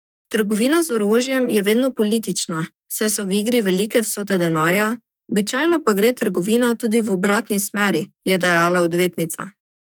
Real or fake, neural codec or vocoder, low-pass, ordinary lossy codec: fake; codec, 44.1 kHz, 2.6 kbps, SNAC; none; none